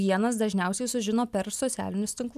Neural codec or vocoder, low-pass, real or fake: none; 14.4 kHz; real